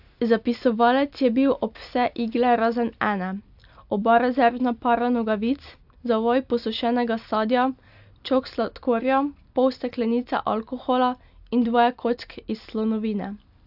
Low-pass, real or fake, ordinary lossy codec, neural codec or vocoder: 5.4 kHz; real; AAC, 48 kbps; none